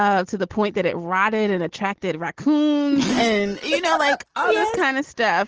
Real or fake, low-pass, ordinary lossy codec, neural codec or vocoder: real; 7.2 kHz; Opus, 16 kbps; none